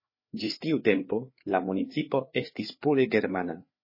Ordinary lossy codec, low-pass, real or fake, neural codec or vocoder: MP3, 24 kbps; 5.4 kHz; fake; codec, 16 kHz, 4 kbps, FreqCodec, larger model